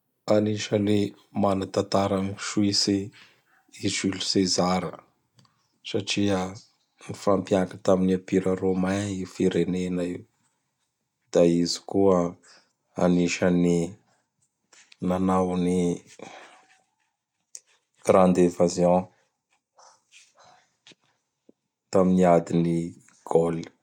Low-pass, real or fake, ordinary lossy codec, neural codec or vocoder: 19.8 kHz; real; none; none